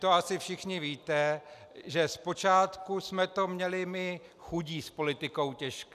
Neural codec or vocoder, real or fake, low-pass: none; real; 14.4 kHz